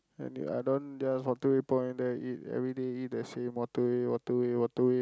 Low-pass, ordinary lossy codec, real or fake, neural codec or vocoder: none; none; real; none